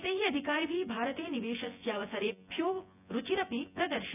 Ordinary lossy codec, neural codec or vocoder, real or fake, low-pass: none; vocoder, 24 kHz, 100 mel bands, Vocos; fake; 3.6 kHz